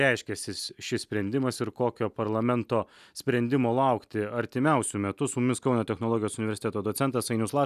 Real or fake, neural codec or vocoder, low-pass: real; none; 14.4 kHz